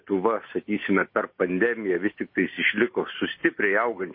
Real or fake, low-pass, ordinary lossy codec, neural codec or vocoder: real; 5.4 kHz; MP3, 24 kbps; none